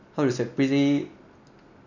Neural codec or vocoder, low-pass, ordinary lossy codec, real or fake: none; 7.2 kHz; none; real